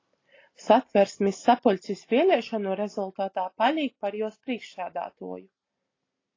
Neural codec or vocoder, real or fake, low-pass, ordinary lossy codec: none; real; 7.2 kHz; AAC, 32 kbps